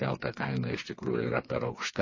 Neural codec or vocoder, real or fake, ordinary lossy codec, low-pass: codec, 16 kHz, 4 kbps, FreqCodec, smaller model; fake; MP3, 32 kbps; 7.2 kHz